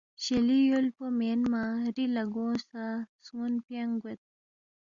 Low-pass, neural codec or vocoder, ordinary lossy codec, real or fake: 7.2 kHz; none; Opus, 64 kbps; real